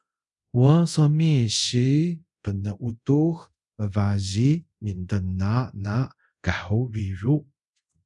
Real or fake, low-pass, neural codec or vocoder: fake; 10.8 kHz; codec, 24 kHz, 0.5 kbps, DualCodec